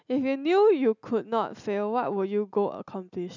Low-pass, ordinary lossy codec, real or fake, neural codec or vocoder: 7.2 kHz; none; real; none